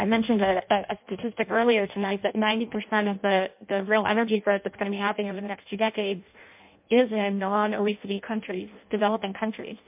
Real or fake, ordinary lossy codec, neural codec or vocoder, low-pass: fake; MP3, 32 kbps; codec, 16 kHz in and 24 kHz out, 0.6 kbps, FireRedTTS-2 codec; 3.6 kHz